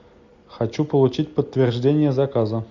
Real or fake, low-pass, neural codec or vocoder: real; 7.2 kHz; none